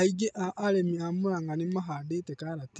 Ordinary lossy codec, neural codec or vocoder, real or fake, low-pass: none; none; real; none